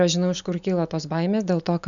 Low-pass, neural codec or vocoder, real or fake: 7.2 kHz; none; real